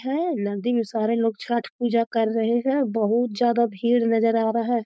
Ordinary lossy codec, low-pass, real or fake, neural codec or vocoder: none; none; fake; codec, 16 kHz, 4.8 kbps, FACodec